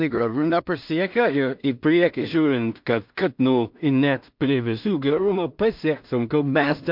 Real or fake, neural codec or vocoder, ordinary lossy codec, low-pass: fake; codec, 16 kHz in and 24 kHz out, 0.4 kbps, LongCat-Audio-Codec, two codebook decoder; MP3, 48 kbps; 5.4 kHz